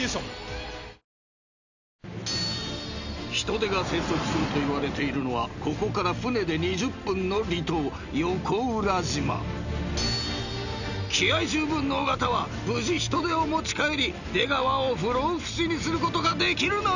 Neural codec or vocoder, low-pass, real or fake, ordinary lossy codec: none; 7.2 kHz; real; none